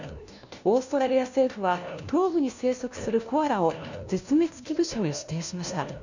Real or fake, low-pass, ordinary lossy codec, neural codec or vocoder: fake; 7.2 kHz; none; codec, 16 kHz, 1 kbps, FunCodec, trained on LibriTTS, 50 frames a second